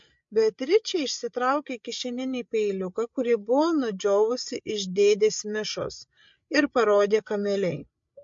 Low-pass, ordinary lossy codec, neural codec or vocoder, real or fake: 7.2 kHz; MP3, 48 kbps; codec, 16 kHz, 8 kbps, FreqCodec, larger model; fake